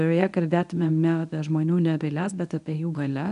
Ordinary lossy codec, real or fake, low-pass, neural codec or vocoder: AAC, 96 kbps; fake; 10.8 kHz; codec, 24 kHz, 0.9 kbps, WavTokenizer, medium speech release version 1